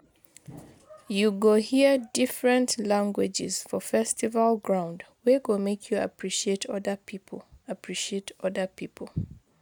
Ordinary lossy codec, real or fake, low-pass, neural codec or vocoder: none; real; none; none